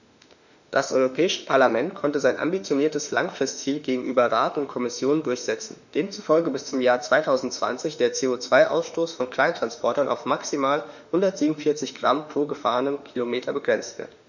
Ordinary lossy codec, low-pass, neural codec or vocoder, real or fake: none; 7.2 kHz; autoencoder, 48 kHz, 32 numbers a frame, DAC-VAE, trained on Japanese speech; fake